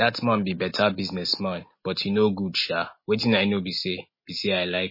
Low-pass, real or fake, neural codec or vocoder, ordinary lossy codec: 5.4 kHz; real; none; MP3, 24 kbps